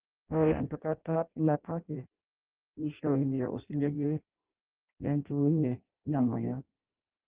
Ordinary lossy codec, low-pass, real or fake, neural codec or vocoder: Opus, 16 kbps; 3.6 kHz; fake; codec, 16 kHz in and 24 kHz out, 0.6 kbps, FireRedTTS-2 codec